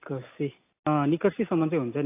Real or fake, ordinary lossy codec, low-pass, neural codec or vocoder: real; AAC, 24 kbps; 3.6 kHz; none